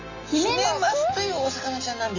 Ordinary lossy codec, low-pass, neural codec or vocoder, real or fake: none; 7.2 kHz; none; real